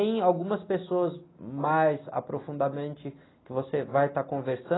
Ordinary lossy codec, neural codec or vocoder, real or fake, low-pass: AAC, 16 kbps; none; real; 7.2 kHz